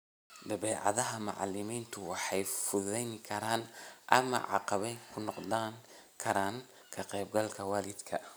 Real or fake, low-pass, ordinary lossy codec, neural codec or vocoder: real; none; none; none